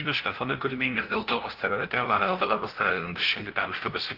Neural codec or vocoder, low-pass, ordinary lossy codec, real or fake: codec, 16 kHz, 0.5 kbps, FunCodec, trained on LibriTTS, 25 frames a second; 5.4 kHz; Opus, 16 kbps; fake